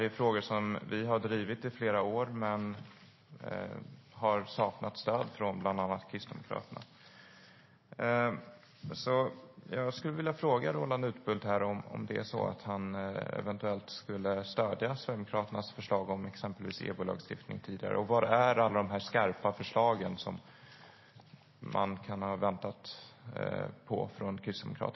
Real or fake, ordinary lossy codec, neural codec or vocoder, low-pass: real; MP3, 24 kbps; none; 7.2 kHz